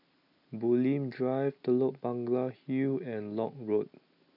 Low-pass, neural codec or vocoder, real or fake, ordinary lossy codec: 5.4 kHz; none; real; none